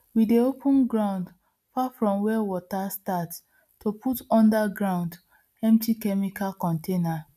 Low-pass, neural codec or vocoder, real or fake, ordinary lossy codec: 14.4 kHz; none; real; none